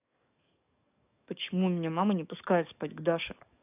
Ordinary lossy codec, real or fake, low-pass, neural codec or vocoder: none; fake; 3.6 kHz; codec, 44.1 kHz, 7.8 kbps, DAC